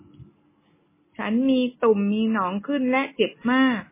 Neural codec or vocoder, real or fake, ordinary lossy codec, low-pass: none; real; MP3, 16 kbps; 3.6 kHz